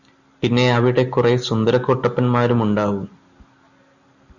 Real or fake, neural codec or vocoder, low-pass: real; none; 7.2 kHz